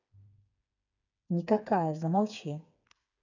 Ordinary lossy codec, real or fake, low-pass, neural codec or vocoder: none; fake; 7.2 kHz; codec, 16 kHz, 4 kbps, FreqCodec, smaller model